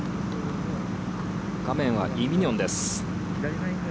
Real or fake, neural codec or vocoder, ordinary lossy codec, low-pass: real; none; none; none